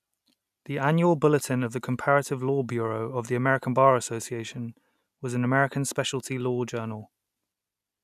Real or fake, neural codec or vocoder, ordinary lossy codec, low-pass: real; none; none; 14.4 kHz